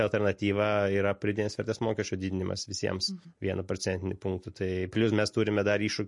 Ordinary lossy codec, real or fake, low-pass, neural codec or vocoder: MP3, 48 kbps; real; 10.8 kHz; none